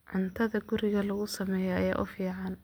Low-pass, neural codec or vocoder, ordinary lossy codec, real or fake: none; none; none; real